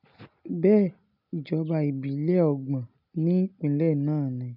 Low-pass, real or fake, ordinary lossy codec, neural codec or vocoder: 5.4 kHz; real; none; none